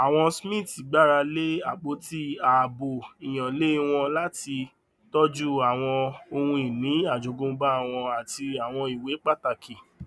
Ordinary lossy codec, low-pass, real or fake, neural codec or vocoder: none; none; real; none